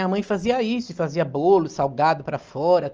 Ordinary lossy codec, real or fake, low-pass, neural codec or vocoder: Opus, 24 kbps; real; 7.2 kHz; none